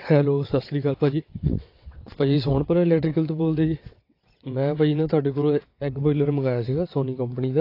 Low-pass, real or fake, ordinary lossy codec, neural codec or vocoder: 5.4 kHz; fake; AAC, 32 kbps; vocoder, 22.05 kHz, 80 mel bands, WaveNeXt